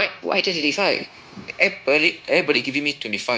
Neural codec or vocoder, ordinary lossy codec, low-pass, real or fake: codec, 16 kHz, 0.9 kbps, LongCat-Audio-Codec; none; none; fake